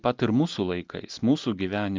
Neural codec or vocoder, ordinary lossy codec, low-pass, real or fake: none; Opus, 32 kbps; 7.2 kHz; real